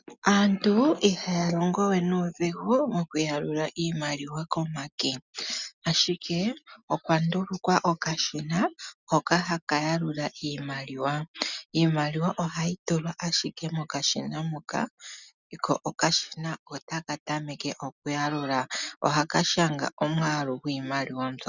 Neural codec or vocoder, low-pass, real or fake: none; 7.2 kHz; real